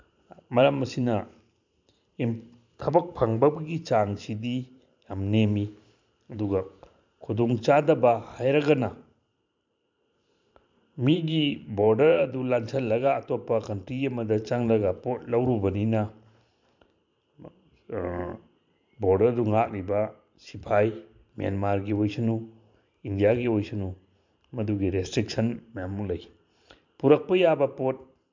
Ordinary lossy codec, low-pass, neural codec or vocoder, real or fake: MP3, 64 kbps; 7.2 kHz; vocoder, 44.1 kHz, 128 mel bands every 512 samples, BigVGAN v2; fake